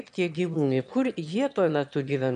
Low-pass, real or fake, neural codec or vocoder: 9.9 kHz; fake; autoencoder, 22.05 kHz, a latent of 192 numbers a frame, VITS, trained on one speaker